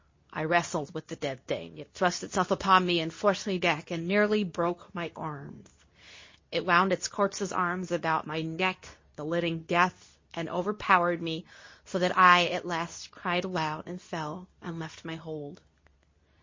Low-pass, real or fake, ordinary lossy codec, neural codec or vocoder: 7.2 kHz; fake; MP3, 32 kbps; codec, 24 kHz, 0.9 kbps, WavTokenizer, medium speech release version 2